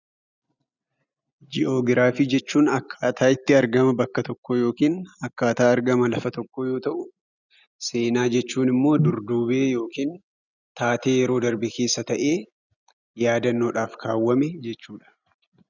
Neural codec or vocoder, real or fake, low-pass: none; real; 7.2 kHz